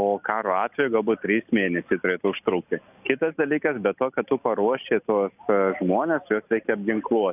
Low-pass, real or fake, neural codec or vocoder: 3.6 kHz; real; none